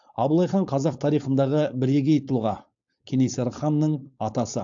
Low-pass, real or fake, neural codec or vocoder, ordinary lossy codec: 7.2 kHz; fake; codec, 16 kHz, 4.8 kbps, FACodec; AAC, 64 kbps